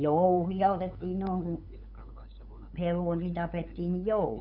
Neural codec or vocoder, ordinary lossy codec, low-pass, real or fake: codec, 16 kHz, 8 kbps, FunCodec, trained on LibriTTS, 25 frames a second; none; 5.4 kHz; fake